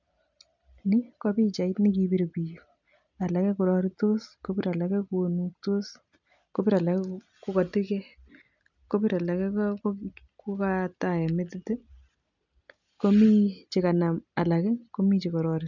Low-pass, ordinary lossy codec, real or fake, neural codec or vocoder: 7.2 kHz; none; real; none